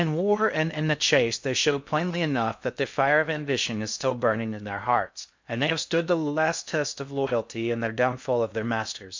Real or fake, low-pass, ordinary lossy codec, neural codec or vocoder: fake; 7.2 kHz; MP3, 64 kbps; codec, 16 kHz in and 24 kHz out, 0.6 kbps, FocalCodec, streaming, 4096 codes